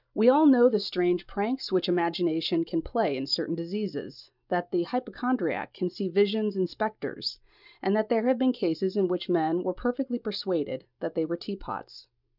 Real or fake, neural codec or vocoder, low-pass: real; none; 5.4 kHz